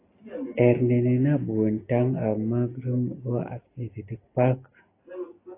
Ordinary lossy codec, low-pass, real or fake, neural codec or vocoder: AAC, 24 kbps; 3.6 kHz; real; none